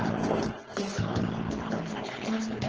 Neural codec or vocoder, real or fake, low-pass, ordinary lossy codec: codec, 16 kHz, 4.8 kbps, FACodec; fake; 7.2 kHz; Opus, 16 kbps